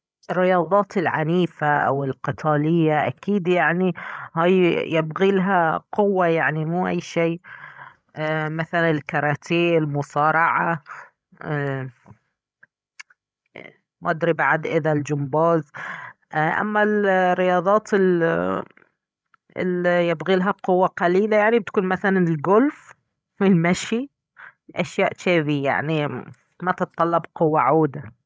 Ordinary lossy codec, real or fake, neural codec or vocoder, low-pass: none; fake; codec, 16 kHz, 16 kbps, FreqCodec, larger model; none